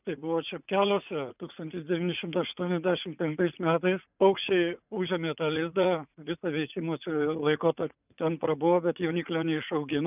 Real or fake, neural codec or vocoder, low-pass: fake; vocoder, 44.1 kHz, 80 mel bands, Vocos; 3.6 kHz